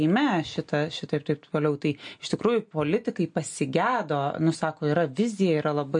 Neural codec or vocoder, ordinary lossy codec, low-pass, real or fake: none; MP3, 48 kbps; 9.9 kHz; real